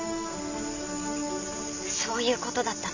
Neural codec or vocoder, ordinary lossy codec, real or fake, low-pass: none; none; real; 7.2 kHz